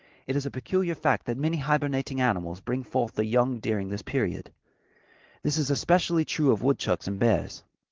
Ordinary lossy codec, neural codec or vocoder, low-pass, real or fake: Opus, 16 kbps; none; 7.2 kHz; real